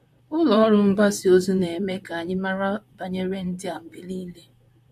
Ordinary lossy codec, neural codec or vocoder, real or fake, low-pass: MP3, 64 kbps; vocoder, 44.1 kHz, 128 mel bands, Pupu-Vocoder; fake; 14.4 kHz